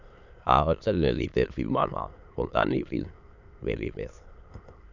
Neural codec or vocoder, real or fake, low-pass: autoencoder, 22.05 kHz, a latent of 192 numbers a frame, VITS, trained on many speakers; fake; 7.2 kHz